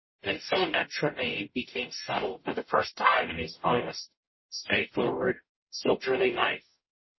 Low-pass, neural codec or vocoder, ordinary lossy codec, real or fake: 7.2 kHz; codec, 44.1 kHz, 0.9 kbps, DAC; MP3, 24 kbps; fake